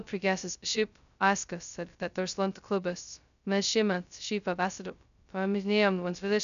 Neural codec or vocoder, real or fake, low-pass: codec, 16 kHz, 0.2 kbps, FocalCodec; fake; 7.2 kHz